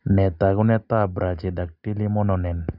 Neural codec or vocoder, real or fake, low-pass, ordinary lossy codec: none; real; 5.4 kHz; none